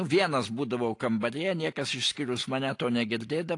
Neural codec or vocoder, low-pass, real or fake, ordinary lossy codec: none; 10.8 kHz; real; AAC, 48 kbps